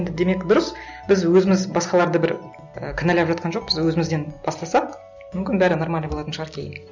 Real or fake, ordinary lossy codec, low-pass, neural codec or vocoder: real; none; 7.2 kHz; none